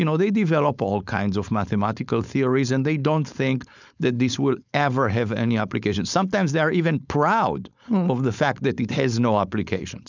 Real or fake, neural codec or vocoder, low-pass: fake; codec, 16 kHz, 4.8 kbps, FACodec; 7.2 kHz